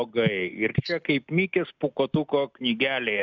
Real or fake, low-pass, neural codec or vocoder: real; 7.2 kHz; none